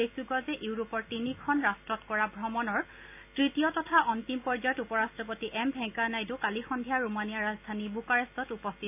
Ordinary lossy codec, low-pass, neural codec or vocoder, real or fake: AAC, 32 kbps; 3.6 kHz; none; real